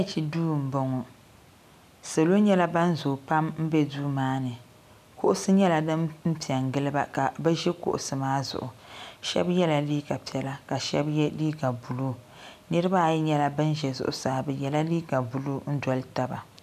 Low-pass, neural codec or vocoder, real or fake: 14.4 kHz; none; real